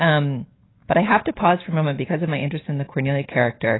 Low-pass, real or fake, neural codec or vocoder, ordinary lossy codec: 7.2 kHz; real; none; AAC, 16 kbps